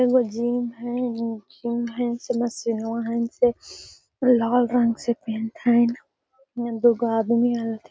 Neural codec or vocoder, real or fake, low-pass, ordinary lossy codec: none; real; none; none